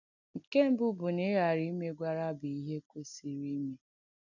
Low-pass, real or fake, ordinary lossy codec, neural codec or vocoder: 7.2 kHz; real; none; none